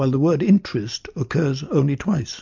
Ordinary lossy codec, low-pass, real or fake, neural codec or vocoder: MP3, 48 kbps; 7.2 kHz; real; none